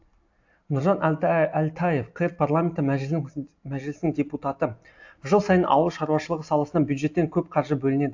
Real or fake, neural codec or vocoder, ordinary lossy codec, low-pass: real; none; AAC, 48 kbps; 7.2 kHz